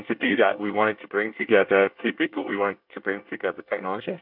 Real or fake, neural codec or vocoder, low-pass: fake; codec, 24 kHz, 1 kbps, SNAC; 5.4 kHz